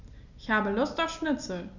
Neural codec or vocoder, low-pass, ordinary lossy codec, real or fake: none; 7.2 kHz; Opus, 64 kbps; real